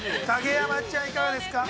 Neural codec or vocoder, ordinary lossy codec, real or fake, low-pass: none; none; real; none